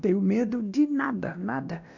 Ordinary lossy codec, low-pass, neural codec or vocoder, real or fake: none; 7.2 kHz; codec, 16 kHz, 1 kbps, X-Codec, WavLM features, trained on Multilingual LibriSpeech; fake